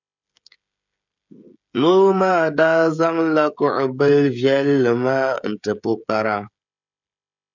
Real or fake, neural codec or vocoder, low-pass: fake; codec, 16 kHz, 16 kbps, FreqCodec, smaller model; 7.2 kHz